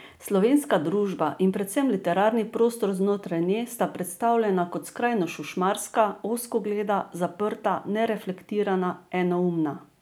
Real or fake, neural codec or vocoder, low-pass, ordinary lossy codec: real; none; none; none